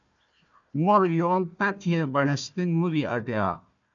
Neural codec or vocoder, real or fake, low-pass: codec, 16 kHz, 1 kbps, FunCodec, trained on Chinese and English, 50 frames a second; fake; 7.2 kHz